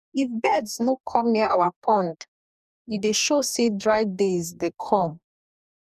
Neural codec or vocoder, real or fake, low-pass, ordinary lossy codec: codec, 44.1 kHz, 2.6 kbps, DAC; fake; 14.4 kHz; none